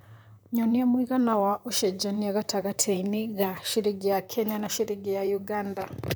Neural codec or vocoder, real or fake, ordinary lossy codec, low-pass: vocoder, 44.1 kHz, 128 mel bands, Pupu-Vocoder; fake; none; none